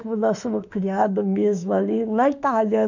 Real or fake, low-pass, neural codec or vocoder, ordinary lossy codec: fake; 7.2 kHz; autoencoder, 48 kHz, 32 numbers a frame, DAC-VAE, trained on Japanese speech; none